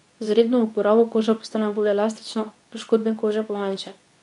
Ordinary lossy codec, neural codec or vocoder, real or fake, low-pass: none; codec, 24 kHz, 0.9 kbps, WavTokenizer, medium speech release version 2; fake; 10.8 kHz